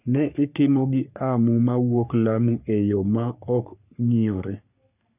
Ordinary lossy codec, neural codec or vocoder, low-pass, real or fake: none; codec, 44.1 kHz, 3.4 kbps, Pupu-Codec; 3.6 kHz; fake